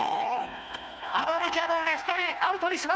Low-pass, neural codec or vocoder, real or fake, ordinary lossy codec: none; codec, 16 kHz, 1 kbps, FunCodec, trained on Chinese and English, 50 frames a second; fake; none